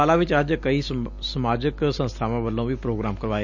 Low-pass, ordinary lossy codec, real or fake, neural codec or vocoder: 7.2 kHz; none; real; none